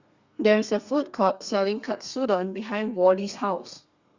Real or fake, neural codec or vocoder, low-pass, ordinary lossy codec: fake; codec, 32 kHz, 1.9 kbps, SNAC; 7.2 kHz; Opus, 64 kbps